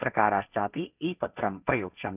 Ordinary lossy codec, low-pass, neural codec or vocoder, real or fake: none; 3.6 kHz; codec, 16 kHz, 1.1 kbps, Voila-Tokenizer; fake